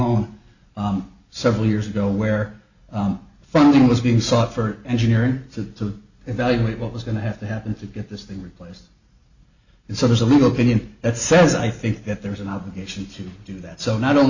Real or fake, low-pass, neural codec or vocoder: real; 7.2 kHz; none